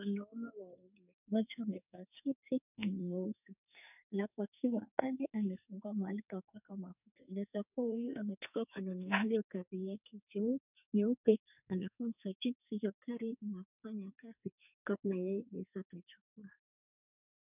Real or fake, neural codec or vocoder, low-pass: fake; codec, 44.1 kHz, 2.6 kbps, SNAC; 3.6 kHz